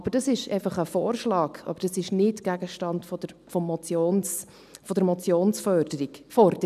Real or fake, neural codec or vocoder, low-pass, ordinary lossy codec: real; none; 14.4 kHz; none